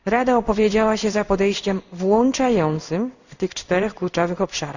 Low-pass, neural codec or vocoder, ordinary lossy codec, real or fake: 7.2 kHz; codec, 16 kHz in and 24 kHz out, 1 kbps, XY-Tokenizer; none; fake